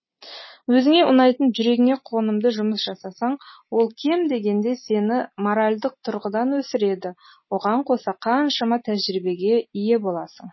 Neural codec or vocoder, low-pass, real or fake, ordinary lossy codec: none; 7.2 kHz; real; MP3, 24 kbps